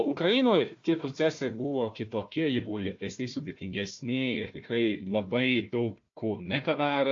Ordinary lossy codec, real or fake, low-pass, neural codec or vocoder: AAC, 48 kbps; fake; 7.2 kHz; codec, 16 kHz, 1 kbps, FunCodec, trained on Chinese and English, 50 frames a second